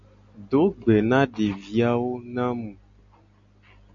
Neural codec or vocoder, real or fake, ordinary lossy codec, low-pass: none; real; MP3, 64 kbps; 7.2 kHz